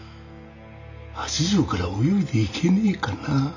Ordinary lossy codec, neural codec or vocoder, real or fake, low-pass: none; none; real; 7.2 kHz